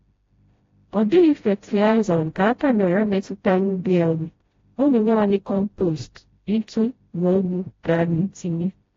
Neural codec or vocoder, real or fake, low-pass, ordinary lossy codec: codec, 16 kHz, 0.5 kbps, FreqCodec, smaller model; fake; 7.2 kHz; AAC, 32 kbps